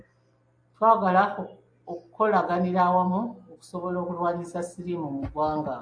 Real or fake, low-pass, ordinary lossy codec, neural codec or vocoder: real; 9.9 kHz; AAC, 64 kbps; none